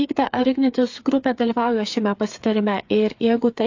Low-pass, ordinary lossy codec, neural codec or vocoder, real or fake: 7.2 kHz; AAC, 48 kbps; codec, 16 kHz, 8 kbps, FreqCodec, smaller model; fake